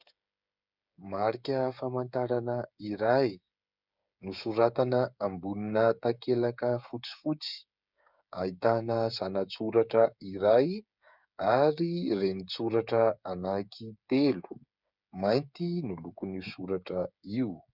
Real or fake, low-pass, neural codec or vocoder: fake; 5.4 kHz; codec, 16 kHz, 8 kbps, FreqCodec, smaller model